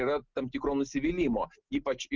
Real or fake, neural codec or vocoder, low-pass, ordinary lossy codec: real; none; 7.2 kHz; Opus, 24 kbps